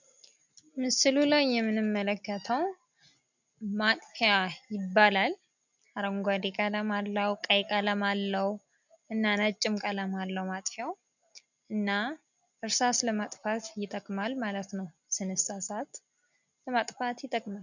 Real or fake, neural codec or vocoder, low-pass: real; none; 7.2 kHz